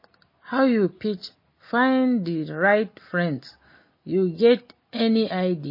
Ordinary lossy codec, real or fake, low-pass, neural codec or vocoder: MP3, 24 kbps; real; 5.4 kHz; none